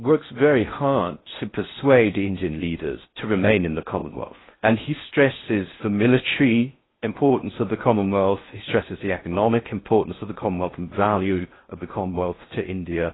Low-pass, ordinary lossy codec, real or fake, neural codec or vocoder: 7.2 kHz; AAC, 16 kbps; fake; codec, 16 kHz in and 24 kHz out, 0.6 kbps, FocalCodec, streaming, 4096 codes